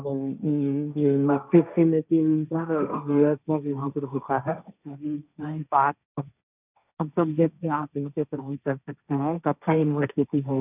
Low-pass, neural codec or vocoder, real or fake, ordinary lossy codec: 3.6 kHz; codec, 16 kHz, 1.1 kbps, Voila-Tokenizer; fake; none